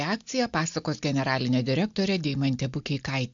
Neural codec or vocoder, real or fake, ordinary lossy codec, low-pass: none; real; AAC, 64 kbps; 7.2 kHz